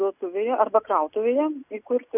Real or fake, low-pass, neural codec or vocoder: real; 3.6 kHz; none